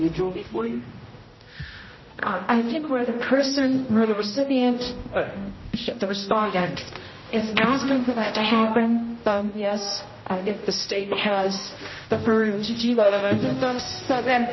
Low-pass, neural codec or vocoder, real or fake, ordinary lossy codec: 7.2 kHz; codec, 16 kHz, 0.5 kbps, X-Codec, HuBERT features, trained on general audio; fake; MP3, 24 kbps